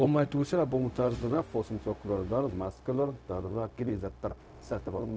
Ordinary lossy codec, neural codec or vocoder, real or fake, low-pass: none; codec, 16 kHz, 0.4 kbps, LongCat-Audio-Codec; fake; none